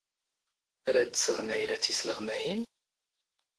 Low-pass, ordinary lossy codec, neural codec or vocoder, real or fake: 10.8 kHz; Opus, 16 kbps; autoencoder, 48 kHz, 32 numbers a frame, DAC-VAE, trained on Japanese speech; fake